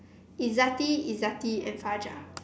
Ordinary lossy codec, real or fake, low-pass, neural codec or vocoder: none; real; none; none